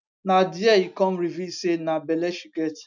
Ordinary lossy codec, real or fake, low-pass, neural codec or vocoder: none; real; 7.2 kHz; none